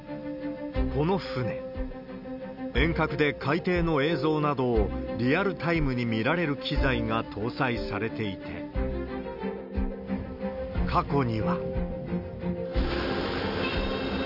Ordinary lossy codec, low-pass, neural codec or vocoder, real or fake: none; 5.4 kHz; none; real